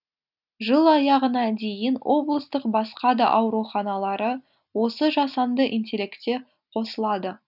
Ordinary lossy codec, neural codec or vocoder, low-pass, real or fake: none; none; 5.4 kHz; real